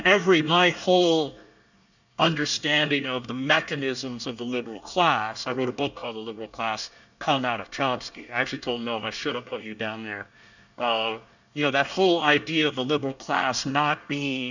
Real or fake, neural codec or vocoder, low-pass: fake; codec, 24 kHz, 1 kbps, SNAC; 7.2 kHz